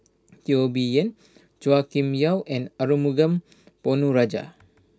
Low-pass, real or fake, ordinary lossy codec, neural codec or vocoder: none; real; none; none